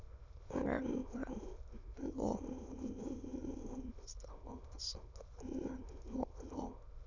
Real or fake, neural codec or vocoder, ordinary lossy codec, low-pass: fake; autoencoder, 22.05 kHz, a latent of 192 numbers a frame, VITS, trained on many speakers; none; 7.2 kHz